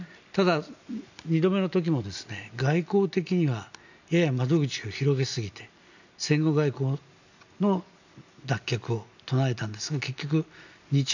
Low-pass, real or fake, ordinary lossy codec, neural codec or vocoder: 7.2 kHz; real; none; none